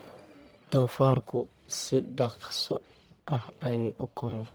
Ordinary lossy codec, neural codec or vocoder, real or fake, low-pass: none; codec, 44.1 kHz, 1.7 kbps, Pupu-Codec; fake; none